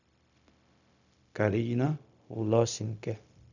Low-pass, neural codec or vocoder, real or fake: 7.2 kHz; codec, 16 kHz, 0.4 kbps, LongCat-Audio-Codec; fake